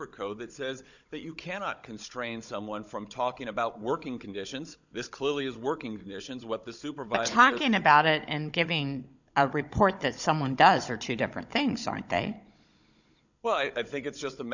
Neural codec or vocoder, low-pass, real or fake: codec, 16 kHz, 16 kbps, FunCodec, trained on Chinese and English, 50 frames a second; 7.2 kHz; fake